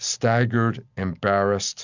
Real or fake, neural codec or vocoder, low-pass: real; none; 7.2 kHz